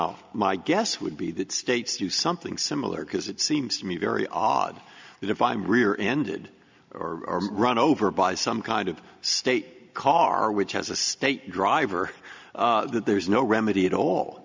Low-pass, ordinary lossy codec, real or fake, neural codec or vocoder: 7.2 kHz; MP3, 64 kbps; real; none